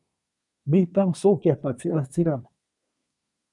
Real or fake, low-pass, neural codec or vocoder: fake; 10.8 kHz; codec, 24 kHz, 1 kbps, SNAC